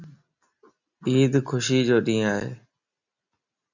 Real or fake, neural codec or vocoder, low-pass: real; none; 7.2 kHz